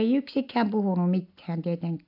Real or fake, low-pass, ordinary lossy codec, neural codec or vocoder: real; 5.4 kHz; AAC, 48 kbps; none